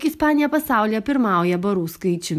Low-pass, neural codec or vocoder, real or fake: 14.4 kHz; none; real